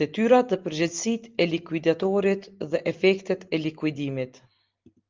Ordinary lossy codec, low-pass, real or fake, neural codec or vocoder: Opus, 32 kbps; 7.2 kHz; real; none